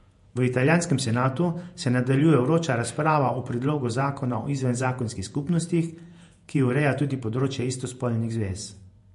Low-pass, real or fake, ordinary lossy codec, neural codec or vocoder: 14.4 kHz; fake; MP3, 48 kbps; vocoder, 48 kHz, 128 mel bands, Vocos